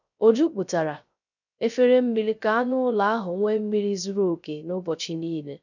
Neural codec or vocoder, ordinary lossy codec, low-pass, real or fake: codec, 16 kHz, 0.3 kbps, FocalCodec; none; 7.2 kHz; fake